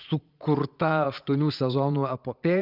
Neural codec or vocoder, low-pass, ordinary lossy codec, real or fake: vocoder, 44.1 kHz, 80 mel bands, Vocos; 5.4 kHz; Opus, 24 kbps; fake